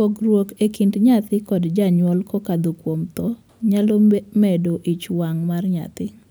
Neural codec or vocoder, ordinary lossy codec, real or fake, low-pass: none; none; real; none